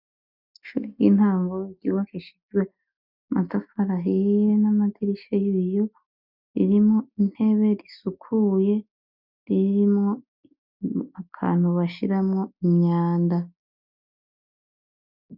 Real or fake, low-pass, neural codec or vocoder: real; 5.4 kHz; none